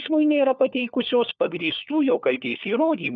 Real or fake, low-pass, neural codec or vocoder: fake; 7.2 kHz; codec, 16 kHz, 4 kbps, FunCodec, trained on LibriTTS, 50 frames a second